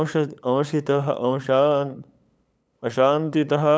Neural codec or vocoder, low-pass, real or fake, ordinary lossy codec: codec, 16 kHz, 8 kbps, FunCodec, trained on LibriTTS, 25 frames a second; none; fake; none